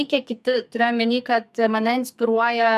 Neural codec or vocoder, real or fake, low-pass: codec, 44.1 kHz, 2.6 kbps, SNAC; fake; 14.4 kHz